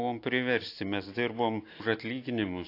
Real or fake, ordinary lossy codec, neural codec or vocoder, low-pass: real; AAC, 32 kbps; none; 5.4 kHz